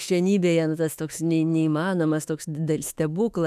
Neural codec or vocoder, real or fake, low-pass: autoencoder, 48 kHz, 32 numbers a frame, DAC-VAE, trained on Japanese speech; fake; 14.4 kHz